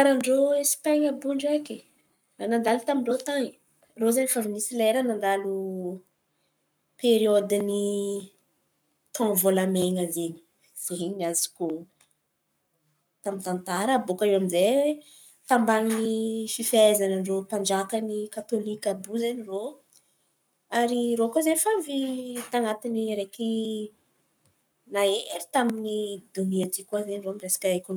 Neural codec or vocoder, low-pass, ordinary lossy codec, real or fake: codec, 44.1 kHz, 7.8 kbps, Pupu-Codec; none; none; fake